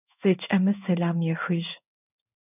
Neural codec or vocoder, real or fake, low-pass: codec, 16 kHz in and 24 kHz out, 1 kbps, XY-Tokenizer; fake; 3.6 kHz